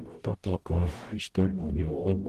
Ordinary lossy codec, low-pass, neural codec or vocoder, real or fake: Opus, 16 kbps; 14.4 kHz; codec, 44.1 kHz, 0.9 kbps, DAC; fake